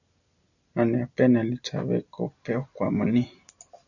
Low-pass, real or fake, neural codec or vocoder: 7.2 kHz; real; none